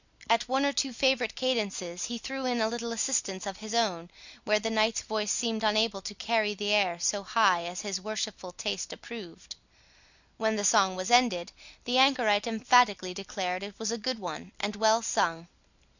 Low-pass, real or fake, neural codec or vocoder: 7.2 kHz; real; none